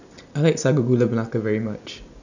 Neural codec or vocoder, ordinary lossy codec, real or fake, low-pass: none; none; real; 7.2 kHz